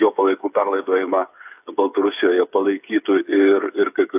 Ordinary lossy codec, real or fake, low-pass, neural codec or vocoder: AAC, 32 kbps; fake; 3.6 kHz; codec, 16 kHz, 8 kbps, FreqCodec, smaller model